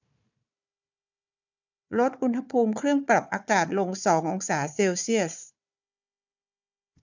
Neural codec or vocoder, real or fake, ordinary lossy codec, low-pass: codec, 16 kHz, 4 kbps, FunCodec, trained on Chinese and English, 50 frames a second; fake; none; 7.2 kHz